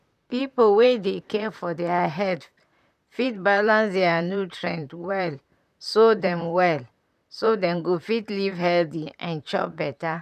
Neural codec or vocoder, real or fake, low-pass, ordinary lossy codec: vocoder, 44.1 kHz, 128 mel bands, Pupu-Vocoder; fake; 14.4 kHz; none